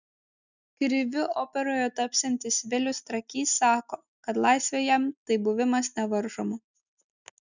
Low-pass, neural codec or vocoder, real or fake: 7.2 kHz; none; real